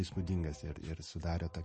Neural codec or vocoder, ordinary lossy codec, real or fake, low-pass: none; MP3, 32 kbps; real; 10.8 kHz